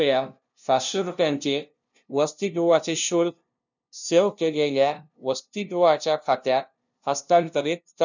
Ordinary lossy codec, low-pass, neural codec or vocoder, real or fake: none; 7.2 kHz; codec, 16 kHz, 0.5 kbps, FunCodec, trained on LibriTTS, 25 frames a second; fake